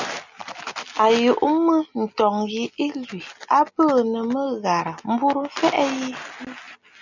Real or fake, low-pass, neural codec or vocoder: real; 7.2 kHz; none